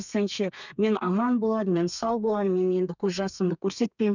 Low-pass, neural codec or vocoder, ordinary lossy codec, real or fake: 7.2 kHz; codec, 32 kHz, 1.9 kbps, SNAC; none; fake